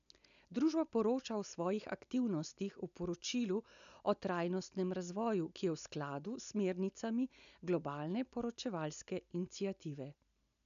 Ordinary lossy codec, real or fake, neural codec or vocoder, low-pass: none; real; none; 7.2 kHz